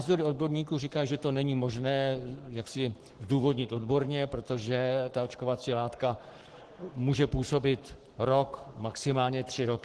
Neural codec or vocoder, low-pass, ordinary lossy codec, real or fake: codec, 44.1 kHz, 7.8 kbps, Pupu-Codec; 10.8 kHz; Opus, 16 kbps; fake